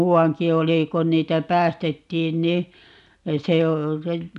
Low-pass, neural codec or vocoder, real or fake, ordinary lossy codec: 10.8 kHz; none; real; none